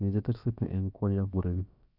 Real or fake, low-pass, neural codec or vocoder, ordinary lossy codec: fake; 5.4 kHz; codec, 16 kHz, about 1 kbps, DyCAST, with the encoder's durations; none